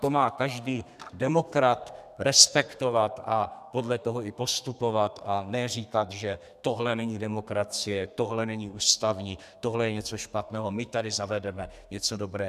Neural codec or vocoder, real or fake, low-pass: codec, 32 kHz, 1.9 kbps, SNAC; fake; 14.4 kHz